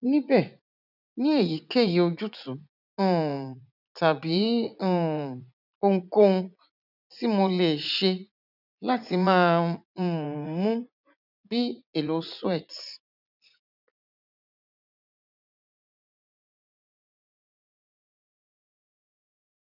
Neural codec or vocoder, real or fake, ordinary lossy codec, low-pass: vocoder, 44.1 kHz, 80 mel bands, Vocos; fake; none; 5.4 kHz